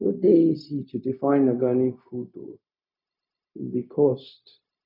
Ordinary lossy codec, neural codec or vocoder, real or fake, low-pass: none; codec, 16 kHz, 0.4 kbps, LongCat-Audio-Codec; fake; 5.4 kHz